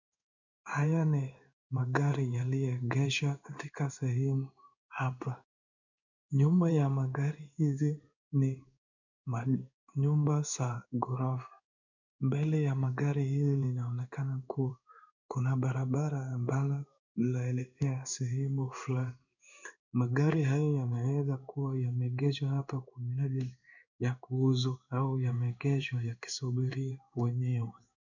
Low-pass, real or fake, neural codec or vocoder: 7.2 kHz; fake; codec, 16 kHz in and 24 kHz out, 1 kbps, XY-Tokenizer